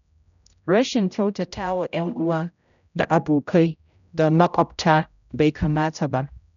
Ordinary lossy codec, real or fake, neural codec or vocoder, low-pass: none; fake; codec, 16 kHz, 0.5 kbps, X-Codec, HuBERT features, trained on general audio; 7.2 kHz